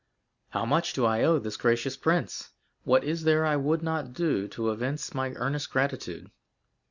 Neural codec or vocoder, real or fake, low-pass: none; real; 7.2 kHz